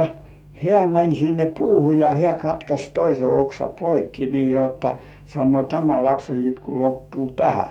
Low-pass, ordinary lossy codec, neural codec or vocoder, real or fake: 19.8 kHz; none; codec, 44.1 kHz, 2.6 kbps, DAC; fake